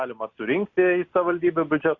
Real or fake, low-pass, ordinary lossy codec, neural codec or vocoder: real; 7.2 kHz; Opus, 64 kbps; none